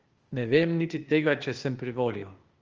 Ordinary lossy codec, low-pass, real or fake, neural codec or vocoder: Opus, 24 kbps; 7.2 kHz; fake; codec, 16 kHz, 0.8 kbps, ZipCodec